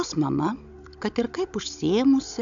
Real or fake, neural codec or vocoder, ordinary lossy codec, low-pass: fake; codec, 16 kHz, 16 kbps, FunCodec, trained on Chinese and English, 50 frames a second; MP3, 64 kbps; 7.2 kHz